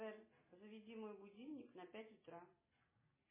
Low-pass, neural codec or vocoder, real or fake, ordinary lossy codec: 3.6 kHz; none; real; MP3, 16 kbps